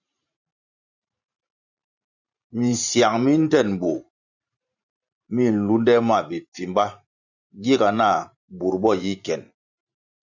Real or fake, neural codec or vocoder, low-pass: fake; vocoder, 44.1 kHz, 128 mel bands every 512 samples, BigVGAN v2; 7.2 kHz